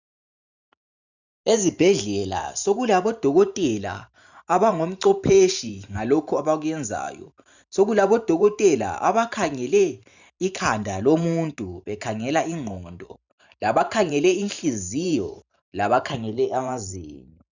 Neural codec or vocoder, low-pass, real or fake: vocoder, 44.1 kHz, 128 mel bands every 512 samples, BigVGAN v2; 7.2 kHz; fake